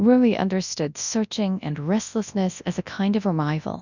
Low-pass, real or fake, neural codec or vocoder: 7.2 kHz; fake; codec, 24 kHz, 0.9 kbps, WavTokenizer, large speech release